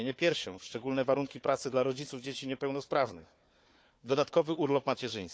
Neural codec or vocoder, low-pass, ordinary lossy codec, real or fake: codec, 16 kHz, 4 kbps, FunCodec, trained on Chinese and English, 50 frames a second; none; none; fake